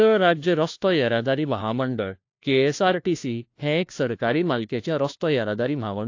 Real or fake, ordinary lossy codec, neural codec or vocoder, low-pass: fake; AAC, 48 kbps; codec, 16 kHz, 1 kbps, FunCodec, trained on LibriTTS, 50 frames a second; 7.2 kHz